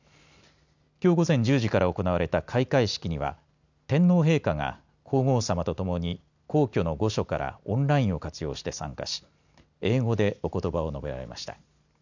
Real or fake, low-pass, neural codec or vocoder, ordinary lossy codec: real; 7.2 kHz; none; none